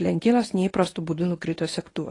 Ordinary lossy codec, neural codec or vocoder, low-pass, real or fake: AAC, 32 kbps; codec, 24 kHz, 0.9 kbps, WavTokenizer, medium speech release version 1; 10.8 kHz; fake